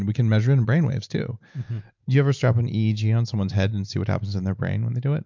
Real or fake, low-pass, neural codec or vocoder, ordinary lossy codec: real; 7.2 kHz; none; MP3, 64 kbps